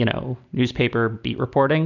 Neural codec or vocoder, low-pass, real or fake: none; 7.2 kHz; real